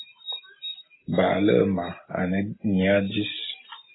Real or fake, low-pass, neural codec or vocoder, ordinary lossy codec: real; 7.2 kHz; none; AAC, 16 kbps